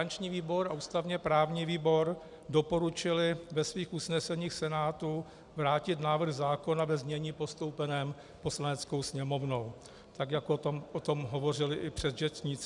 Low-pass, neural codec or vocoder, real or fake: 10.8 kHz; none; real